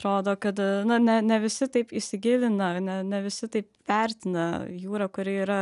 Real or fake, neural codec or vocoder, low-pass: real; none; 10.8 kHz